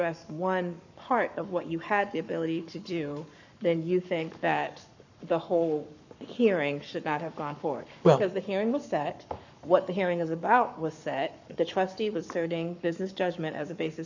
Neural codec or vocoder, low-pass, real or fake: codec, 44.1 kHz, 7.8 kbps, Pupu-Codec; 7.2 kHz; fake